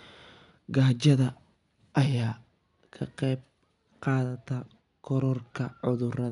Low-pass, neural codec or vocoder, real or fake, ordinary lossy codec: 10.8 kHz; none; real; none